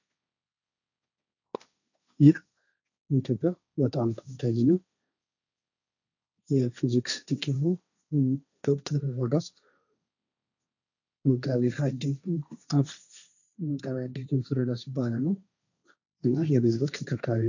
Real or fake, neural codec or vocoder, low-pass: fake; codec, 16 kHz, 1.1 kbps, Voila-Tokenizer; 7.2 kHz